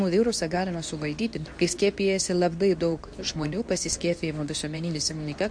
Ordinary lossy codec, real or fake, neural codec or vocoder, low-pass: AAC, 64 kbps; fake; codec, 24 kHz, 0.9 kbps, WavTokenizer, medium speech release version 2; 9.9 kHz